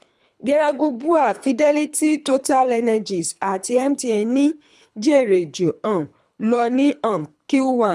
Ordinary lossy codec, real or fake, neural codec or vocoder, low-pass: none; fake; codec, 24 kHz, 3 kbps, HILCodec; none